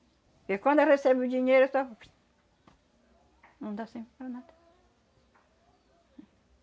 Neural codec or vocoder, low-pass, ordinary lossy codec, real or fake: none; none; none; real